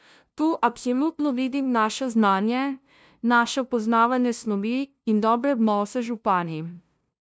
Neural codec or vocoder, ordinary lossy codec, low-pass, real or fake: codec, 16 kHz, 0.5 kbps, FunCodec, trained on LibriTTS, 25 frames a second; none; none; fake